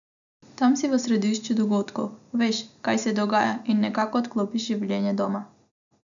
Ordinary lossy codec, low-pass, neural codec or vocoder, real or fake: none; 7.2 kHz; none; real